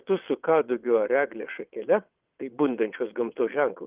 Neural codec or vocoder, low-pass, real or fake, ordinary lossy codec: vocoder, 22.05 kHz, 80 mel bands, WaveNeXt; 3.6 kHz; fake; Opus, 32 kbps